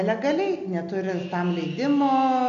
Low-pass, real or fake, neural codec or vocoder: 7.2 kHz; real; none